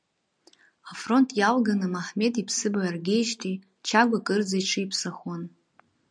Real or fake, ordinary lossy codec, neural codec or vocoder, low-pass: real; MP3, 96 kbps; none; 9.9 kHz